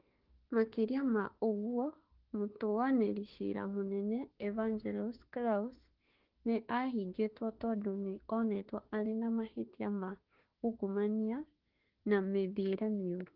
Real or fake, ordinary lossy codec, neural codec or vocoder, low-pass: fake; Opus, 16 kbps; autoencoder, 48 kHz, 32 numbers a frame, DAC-VAE, trained on Japanese speech; 5.4 kHz